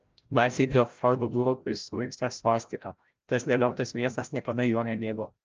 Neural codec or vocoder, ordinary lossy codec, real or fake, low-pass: codec, 16 kHz, 0.5 kbps, FreqCodec, larger model; Opus, 24 kbps; fake; 7.2 kHz